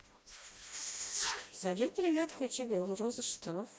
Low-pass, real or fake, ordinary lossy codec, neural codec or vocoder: none; fake; none; codec, 16 kHz, 1 kbps, FreqCodec, smaller model